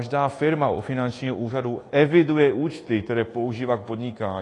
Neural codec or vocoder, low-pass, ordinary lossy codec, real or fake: codec, 24 kHz, 1.2 kbps, DualCodec; 10.8 kHz; AAC, 32 kbps; fake